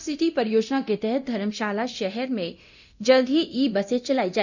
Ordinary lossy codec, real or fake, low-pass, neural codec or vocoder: none; fake; 7.2 kHz; codec, 24 kHz, 0.9 kbps, DualCodec